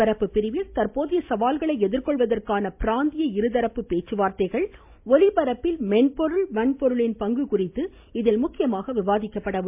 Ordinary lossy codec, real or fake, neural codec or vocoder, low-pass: MP3, 32 kbps; real; none; 3.6 kHz